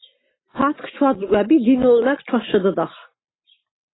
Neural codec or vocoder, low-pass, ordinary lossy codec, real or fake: none; 7.2 kHz; AAC, 16 kbps; real